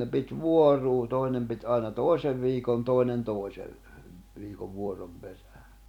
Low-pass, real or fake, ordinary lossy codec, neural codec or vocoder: 19.8 kHz; fake; none; vocoder, 44.1 kHz, 128 mel bands every 256 samples, BigVGAN v2